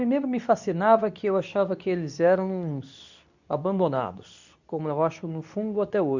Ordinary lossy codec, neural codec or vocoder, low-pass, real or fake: none; codec, 24 kHz, 0.9 kbps, WavTokenizer, medium speech release version 2; 7.2 kHz; fake